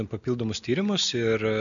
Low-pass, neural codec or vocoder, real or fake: 7.2 kHz; none; real